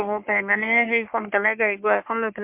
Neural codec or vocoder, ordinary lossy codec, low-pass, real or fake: codec, 44.1 kHz, 3.4 kbps, Pupu-Codec; MP3, 32 kbps; 3.6 kHz; fake